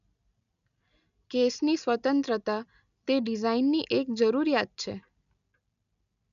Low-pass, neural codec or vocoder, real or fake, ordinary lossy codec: 7.2 kHz; none; real; none